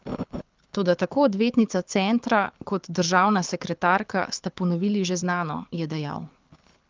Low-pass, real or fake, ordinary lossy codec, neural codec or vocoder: 7.2 kHz; fake; Opus, 24 kbps; codec, 24 kHz, 6 kbps, HILCodec